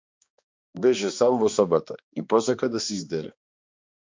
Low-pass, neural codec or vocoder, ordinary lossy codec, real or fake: 7.2 kHz; codec, 16 kHz, 2 kbps, X-Codec, HuBERT features, trained on balanced general audio; MP3, 64 kbps; fake